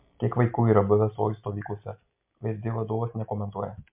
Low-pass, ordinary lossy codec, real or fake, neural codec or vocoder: 3.6 kHz; MP3, 32 kbps; real; none